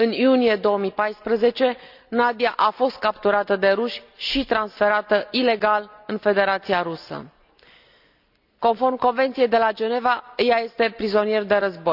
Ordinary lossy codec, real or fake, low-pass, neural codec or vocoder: none; real; 5.4 kHz; none